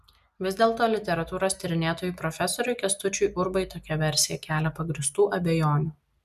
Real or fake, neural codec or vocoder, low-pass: real; none; 14.4 kHz